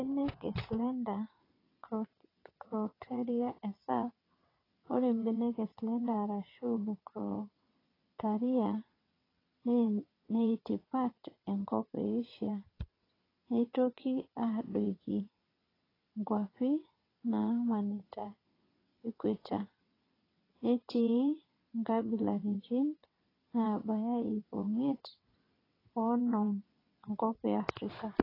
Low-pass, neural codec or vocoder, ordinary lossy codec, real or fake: 5.4 kHz; vocoder, 22.05 kHz, 80 mel bands, WaveNeXt; AAC, 24 kbps; fake